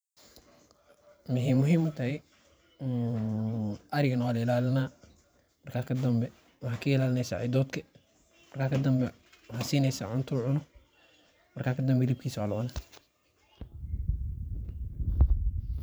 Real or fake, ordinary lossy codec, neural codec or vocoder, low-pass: fake; none; vocoder, 44.1 kHz, 128 mel bands every 512 samples, BigVGAN v2; none